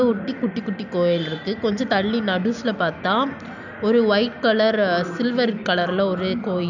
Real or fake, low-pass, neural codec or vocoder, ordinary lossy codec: real; 7.2 kHz; none; none